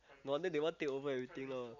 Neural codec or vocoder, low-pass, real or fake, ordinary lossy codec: none; 7.2 kHz; real; none